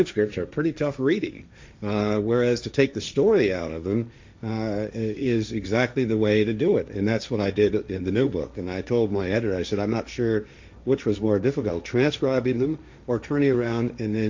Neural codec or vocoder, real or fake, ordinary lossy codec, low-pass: codec, 16 kHz, 1.1 kbps, Voila-Tokenizer; fake; MP3, 64 kbps; 7.2 kHz